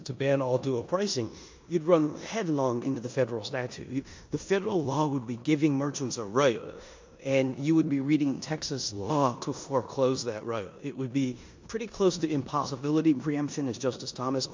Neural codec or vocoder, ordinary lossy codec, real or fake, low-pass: codec, 16 kHz in and 24 kHz out, 0.9 kbps, LongCat-Audio-Codec, four codebook decoder; MP3, 48 kbps; fake; 7.2 kHz